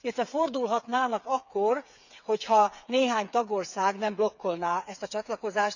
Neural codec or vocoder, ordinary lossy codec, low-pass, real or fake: codec, 16 kHz, 8 kbps, FreqCodec, smaller model; none; 7.2 kHz; fake